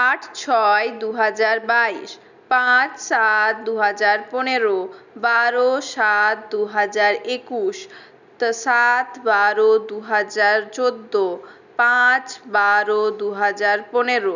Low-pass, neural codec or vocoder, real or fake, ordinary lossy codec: 7.2 kHz; none; real; none